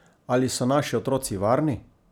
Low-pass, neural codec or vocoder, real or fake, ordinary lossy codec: none; none; real; none